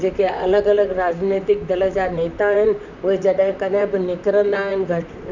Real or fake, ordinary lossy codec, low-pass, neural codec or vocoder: fake; none; 7.2 kHz; vocoder, 44.1 kHz, 128 mel bands, Pupu-Vocoder